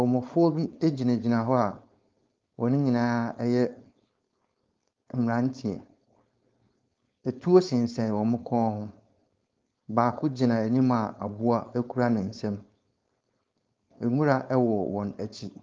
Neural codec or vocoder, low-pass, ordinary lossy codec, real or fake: codec, 16 kHz, 4.8 kbps, FACodec; 7.2 kHz; Opus, 32 kbps; fake